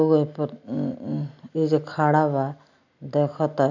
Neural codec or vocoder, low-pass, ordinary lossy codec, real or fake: none; 7.2 kHz; none; real